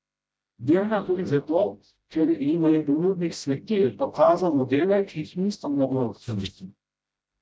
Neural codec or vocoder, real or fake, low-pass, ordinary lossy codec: codec, 16 kHz, 0.5 kbps, FreqCodec, smaller model; fake; none; none